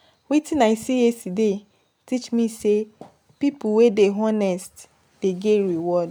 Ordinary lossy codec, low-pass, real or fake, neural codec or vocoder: none; none; real; none